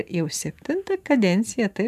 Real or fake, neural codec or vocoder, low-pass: fake; vocoder, 44.1 kHz, 128 mel bands every 512 samples, BigVGAN v2; 14.4 kHz